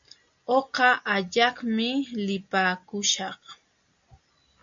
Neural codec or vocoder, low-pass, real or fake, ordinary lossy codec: none; 7.2 kHz; real; MP3, 64 kbps